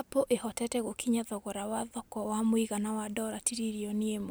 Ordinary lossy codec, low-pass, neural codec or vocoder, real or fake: none; none; none; real